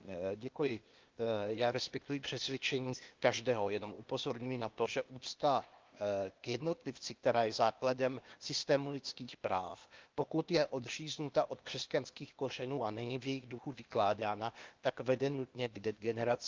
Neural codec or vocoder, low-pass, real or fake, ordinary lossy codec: codec, 16 kHz, 0.8 kbps, ZipCodec; 7.2 kHz; fake; Opus, 32 kbps